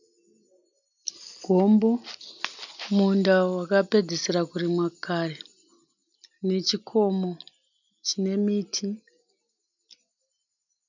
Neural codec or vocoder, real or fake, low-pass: none; real; 7.2 kHz